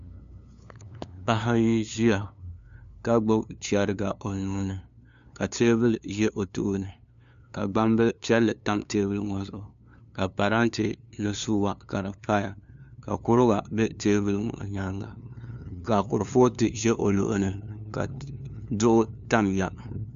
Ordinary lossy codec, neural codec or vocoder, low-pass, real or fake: MP3, 64 kbps; codec, 16 kHz, 2 kbps, FunCodec, trained on LibriTTS, 25 frames a second; 7.2 kHz; fake